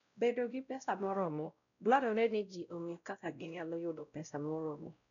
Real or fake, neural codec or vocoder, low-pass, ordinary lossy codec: fake; codec, 16 kHz, 0.5 kbps, X-Codec, WavLM features, trained on Multilingual LibriSpeech; 7.2 kHz; MP3, 96 kbps